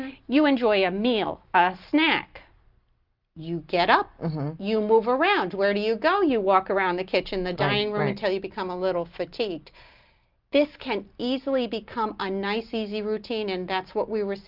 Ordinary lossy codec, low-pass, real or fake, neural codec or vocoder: Opus, 32 kbps; 5.4 kHz; real; none